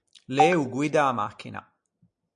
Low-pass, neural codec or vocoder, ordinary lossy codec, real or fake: 9.9 kHz; none; MP3, 96 kbps; real